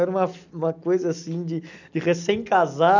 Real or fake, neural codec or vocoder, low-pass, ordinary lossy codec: real; none; 7.2 kHz; none